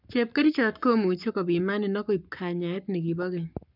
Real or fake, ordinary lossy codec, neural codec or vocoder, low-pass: fake; none; codec, 44.1 kHz, 7.8 kbps, Pupu-Codec; 5.4 kHz